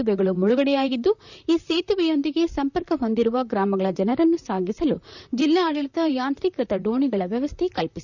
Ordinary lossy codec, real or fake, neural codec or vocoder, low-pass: none; fake; vocoder, 44.1 kHz, 128 mel bands, Pupu-Vocoder; 7.2 kHz